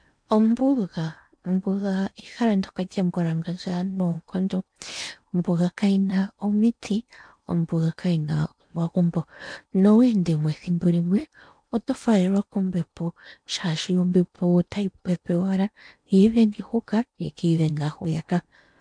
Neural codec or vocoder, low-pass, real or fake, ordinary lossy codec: codec, 16 kHz in and 24 kHz out, 0.8 kbps, FocalCodec, streaming, 65536 codes; 9.9 kHz; fake; MP3, 64 kbps